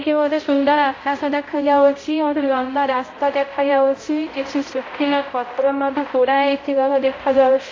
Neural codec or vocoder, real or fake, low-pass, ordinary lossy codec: codec, 16 kHz, 0.5 kbps, X-Codec, HuBERT features, trained on balanced general audio; fake; 7.2 kHz; AAC, 32 kbps